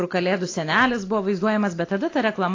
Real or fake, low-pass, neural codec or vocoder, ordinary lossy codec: real; 7.2 kHz; none; AAC, 32 kbps